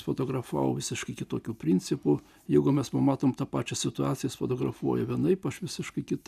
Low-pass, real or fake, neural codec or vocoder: 14.4 kHz; real; none